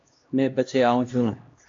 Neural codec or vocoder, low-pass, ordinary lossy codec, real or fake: codec, 16 kHz, 1 kbps, X-Codec, HuBERT features, trained on LibriSpeech; 7.2 kHz; AAC, 48 kbps; fake